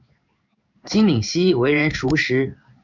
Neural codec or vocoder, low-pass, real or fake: codec, 16 kHz in and 24 kHz out, 1 kbps, XY-Tokenizer; 7.2 kHz; fake